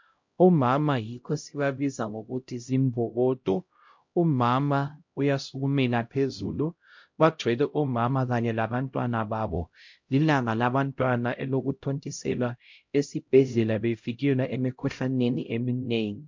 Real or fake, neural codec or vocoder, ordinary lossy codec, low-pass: fake; codec, 16 kHz, 0.5 kbps, X-Codec, HuBERT features, trained on LibriSpeech; MP3, 48 kbps; 7.2 kHz